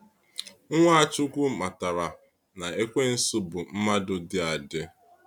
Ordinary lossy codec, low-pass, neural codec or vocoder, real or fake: none; 19.8 kHz; none; real